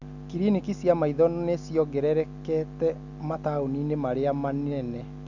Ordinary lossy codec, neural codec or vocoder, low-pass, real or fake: none; none; 7.2 kHz; real